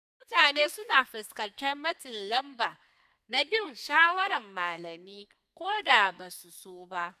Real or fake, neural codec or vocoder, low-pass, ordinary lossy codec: fake; codec, 32 kHz, 1.9 kbps, SNAC; 14.4 kHz; none